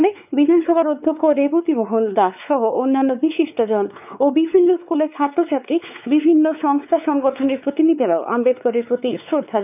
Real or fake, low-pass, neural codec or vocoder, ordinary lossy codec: fake; 3.6 kHz; codec, 16 kHz, 4 kbps, X-Codec, WavLM features, trained on Multilingual LibriSpeech; none